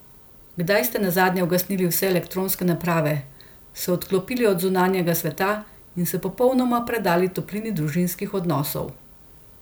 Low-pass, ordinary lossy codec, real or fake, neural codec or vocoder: none; none; real; none